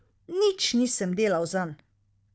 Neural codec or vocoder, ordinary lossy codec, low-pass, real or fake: codec, 16 kHz, 4 kbps, FunCodec, trained on Chinese and English, 50 frames a second; none; none; fake